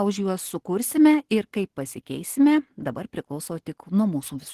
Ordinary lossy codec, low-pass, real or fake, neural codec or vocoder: Opus, 16 kbps; 14.4 kHz; real; none